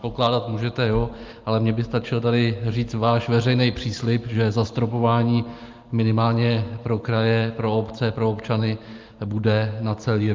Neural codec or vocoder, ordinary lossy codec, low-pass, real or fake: none; Opus, 32 kbps; 7.2 kHz; real